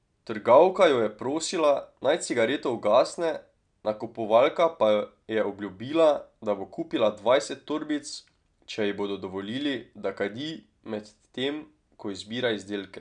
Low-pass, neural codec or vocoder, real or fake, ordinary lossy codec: 9.9 kHz; none; real; none